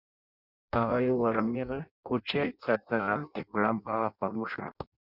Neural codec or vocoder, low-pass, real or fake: codec, 16 kHz in and 24 kHz out, 0.6 kbps, FireRedTTS-2 codec; 5.4 kHz; fake